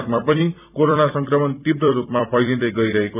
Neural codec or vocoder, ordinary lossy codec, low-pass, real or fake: vocoder, 44.1 kHz, 128 mel bands every 256 samples, BigVGAN v2; none; 3.6 kHz; fake